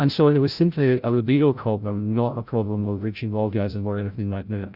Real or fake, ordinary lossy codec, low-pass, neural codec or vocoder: fake; Opus, 64 kbps; 5.4 kHz; codec, 16 kHz, 0.5 kbps, FreqCodec, larger model